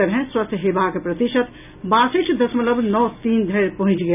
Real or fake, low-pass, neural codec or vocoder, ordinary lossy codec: real; 3.6 kHz; none; none